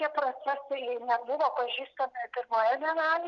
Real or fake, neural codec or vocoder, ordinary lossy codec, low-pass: real; none; Opus, 64 kbps; 7.2 kHz